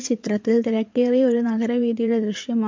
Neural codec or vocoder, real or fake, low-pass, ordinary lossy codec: vocoder, 22.05 kHz, 80 mel bands, WaveNeXt; fake; 7.2 kHz; MP3, 48 kbps